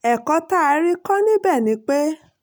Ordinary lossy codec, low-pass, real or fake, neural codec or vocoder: none; none; real; none